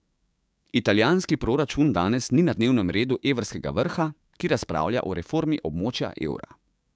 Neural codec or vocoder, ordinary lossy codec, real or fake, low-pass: codec, 16 kHz, 6 kbps, DAC; none; fake; none